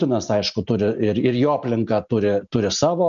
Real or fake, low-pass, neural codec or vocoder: real; 7.2 kHz; none